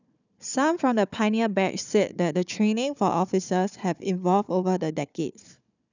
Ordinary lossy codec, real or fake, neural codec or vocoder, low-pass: none; fake; codec, 16 kHz, 4 kbps, FunCodec, trained on Chinese and English, 50 frames a second; 7.2 kHz